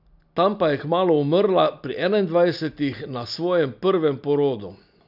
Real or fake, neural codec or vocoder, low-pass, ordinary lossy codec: real; none; 5.4 kHz; none